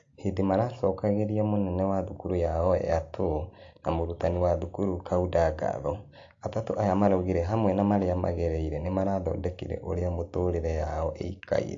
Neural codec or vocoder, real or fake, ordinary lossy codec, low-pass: none; real; AAC, 48 kbps; 7.2 kHz